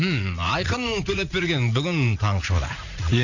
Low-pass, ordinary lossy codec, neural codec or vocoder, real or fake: 7.2 kHz; none; vocoder, 44.1 kHz, 80 mel bands, Vocos; fake